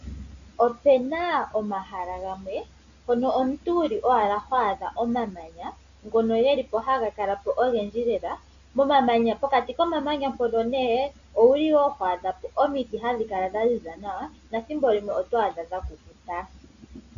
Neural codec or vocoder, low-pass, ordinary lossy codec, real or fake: none; 7.2 kHz; AAC, 96 kbps; real